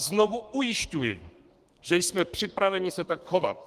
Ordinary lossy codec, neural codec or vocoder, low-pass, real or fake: Opus, 24 kbps; codec, 32 kHz, 1.9 kbps, SNAC; 14.4 kHz; fake